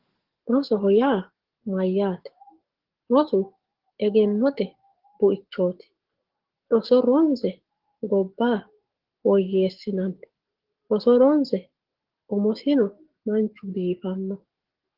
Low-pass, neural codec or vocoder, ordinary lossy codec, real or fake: 5.4 kHz; codec, 44.1 kHz, 7.8 kbps, DAC; Opus, 16 kbps; fake